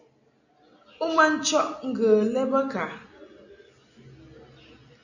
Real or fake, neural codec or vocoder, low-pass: real; none; 7.2 kHz